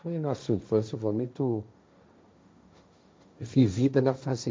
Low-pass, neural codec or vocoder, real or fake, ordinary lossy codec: none; codec, 16 kHz, 1.1 kbps, Voila-Tokenizer; fake; none